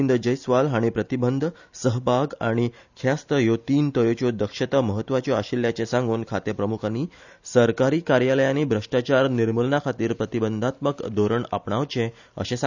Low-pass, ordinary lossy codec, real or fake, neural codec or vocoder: 7.2 kHz; none; real; none